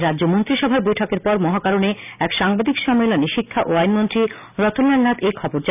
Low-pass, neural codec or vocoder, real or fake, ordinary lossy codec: 3.6 kHz; none; real; none